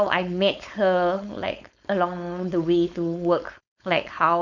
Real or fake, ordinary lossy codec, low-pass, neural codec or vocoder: fake; none; 7.2 kHz; codec, 16 kHz, 4.8 kbps, FACodec